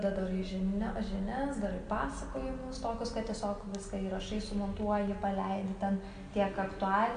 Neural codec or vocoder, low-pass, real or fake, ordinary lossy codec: none; 9.9 kHz; real; MP3, 64 kbps